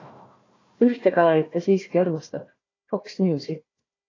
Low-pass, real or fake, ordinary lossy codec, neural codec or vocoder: 7.2 kHz; fake; AAC, 32 kbps; codec, 16 kHz, 1 kbps, FunCodec, trained on Chinese and English, 50 frames a second